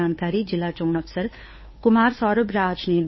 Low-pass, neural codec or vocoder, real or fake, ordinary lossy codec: 7.2 kHz; codec, 16 kHz, 2 kbps, FunCodec, trained on Chinese and English, 25 frames a second; fake; MP3, 24 kbps